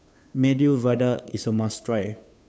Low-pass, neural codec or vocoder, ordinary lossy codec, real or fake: none; codec, 16 kHz, 2 kbps, FunCodec, trained on Chinese and English, 25 frames a second; none; fake